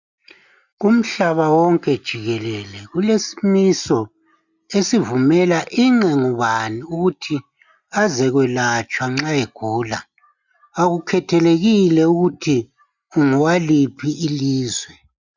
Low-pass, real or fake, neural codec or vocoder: 7.2 kHz; real; none